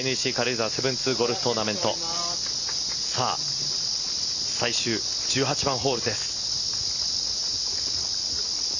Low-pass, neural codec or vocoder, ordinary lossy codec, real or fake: 7.2 kHz; none; none; real